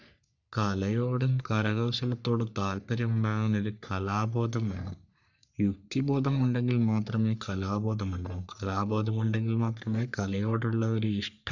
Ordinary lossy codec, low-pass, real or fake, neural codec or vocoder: none; 7.2 kHz; fake; codec, 44.1 kHz, 3.4 kbps, Pupu-Codec